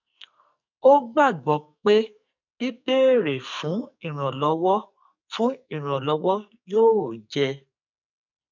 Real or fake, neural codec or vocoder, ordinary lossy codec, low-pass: fake; codec, 44.1 kHz, 2.6 kbps, SNAC; none; 7.2 kHz